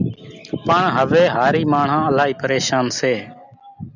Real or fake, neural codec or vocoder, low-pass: real; none; 7.2 kHz